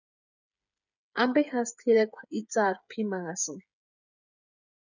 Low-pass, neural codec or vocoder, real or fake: 7.2 kHz; codec, 16 kHz, 8 kbps, FreqCodec, smaller model; fake